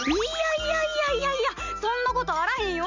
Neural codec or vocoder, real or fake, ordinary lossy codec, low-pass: none; real; none; 7.2 kHz